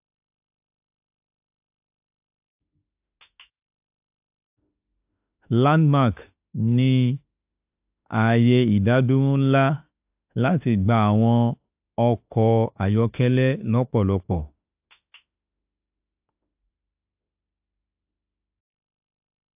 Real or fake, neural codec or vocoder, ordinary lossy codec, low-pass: fake; autoencoder, 48 kHz, 32 numbers a frame, DAC-VAE, trained on Japanese speech; AAC, 32 kbps; 3.6 kHz